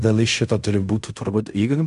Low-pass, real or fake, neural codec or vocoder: 10.8 kHz; fake; codec, 16 kHz in and 24 kHz out, 0.4 kbps, LongCat-Audio-Codec, fine tuned four codebook decoder